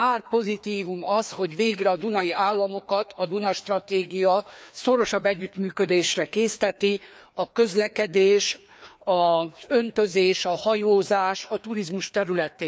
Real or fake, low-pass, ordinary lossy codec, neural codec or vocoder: fake; none; none; codec, 16 kHz, 2 kbps, FreqCodec, larger model